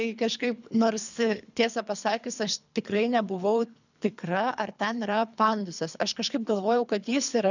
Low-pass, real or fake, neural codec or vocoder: 7.2 kHz; fake; codec, 24 kHz, 3 kbps, HILCodec